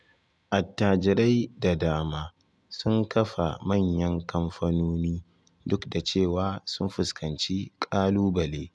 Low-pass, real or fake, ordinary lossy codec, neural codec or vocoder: none; real; none; none